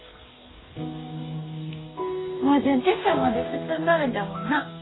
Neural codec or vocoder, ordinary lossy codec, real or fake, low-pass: codec, 44.1 kHz, 2.6 kbps, DAC; AAC, 16 kbps; fake; 7.2 kHz